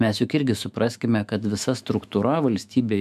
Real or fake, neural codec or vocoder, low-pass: fake; autoencoder, 48 kHz, 128 numbers a frame, DAC-VAE, trained on Japanese speech; 14.4 kHz